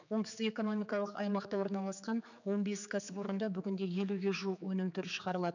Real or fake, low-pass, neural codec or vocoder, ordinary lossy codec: fake; 7.2 kHz; codec, 16 kHz, 4 kbps, X-Codec, HuBERT features, trained on general audio; none